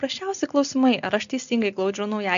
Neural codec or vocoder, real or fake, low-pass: none; real; 7.2 kHz